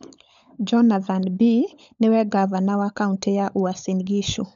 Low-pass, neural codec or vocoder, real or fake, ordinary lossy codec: 7.2 kHz; codec, 16 kHz, 16 kbps, FunCodec, trained on LibriTTS, 50 frames a second; fake; none